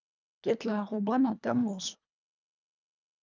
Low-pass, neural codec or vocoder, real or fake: 7.2 kHz; codec, 24 kHz, 1.5 kbps, HILCodec; fake